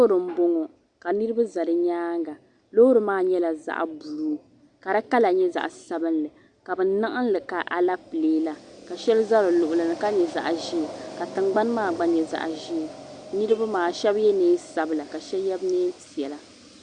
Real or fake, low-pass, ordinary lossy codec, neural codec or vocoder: real; 9.9 kHz; Opus, 64 kbps; none